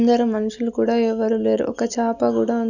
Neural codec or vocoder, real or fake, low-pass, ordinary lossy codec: none; real; 7.2 kHz; none